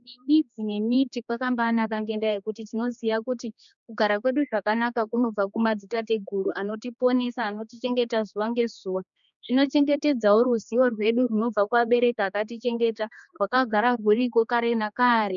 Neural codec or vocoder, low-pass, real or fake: codec, 16 kHz, 2 kbps, X-Codec, HuBERT features, trained on general audio; 7.2 kHz; fake